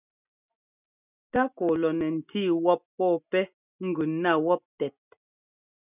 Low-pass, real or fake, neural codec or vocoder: 3.6 kHz; real; none